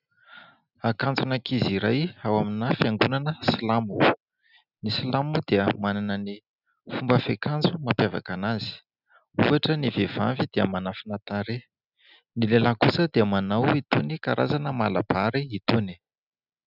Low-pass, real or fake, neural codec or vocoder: 5.4 kHz; real; none